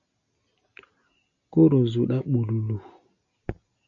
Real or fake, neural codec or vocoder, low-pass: real; none; 7.2 kHz